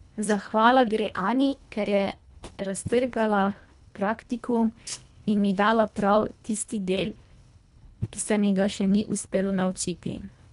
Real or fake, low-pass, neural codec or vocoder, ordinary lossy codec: fake; 10.8 kHz; codec, 24 kHz, 1.5 kbps, HILCodec; none